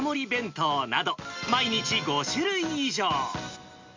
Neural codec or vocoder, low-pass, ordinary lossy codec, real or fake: none; 7.2 kHz; MP3, 64 kbps; real